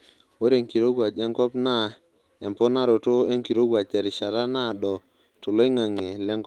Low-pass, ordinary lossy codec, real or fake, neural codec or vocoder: 19.8 kHz; Opus, 24 kbps; fake; autoencoder, 48 kHz, 128 numbers a frame, DAC-VAE, trained on Japanese speech